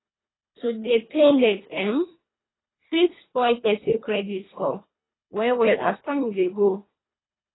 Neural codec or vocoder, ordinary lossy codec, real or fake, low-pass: codec, 24 kHz, 1.5 kbps, HILCodec; AAC, 16 kbps; fake; 7.2 kHz